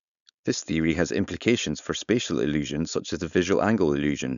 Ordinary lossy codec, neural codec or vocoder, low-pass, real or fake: none; codec, 16 kHz, 4.8 kbps, FACodec; 7.2 kHz; fake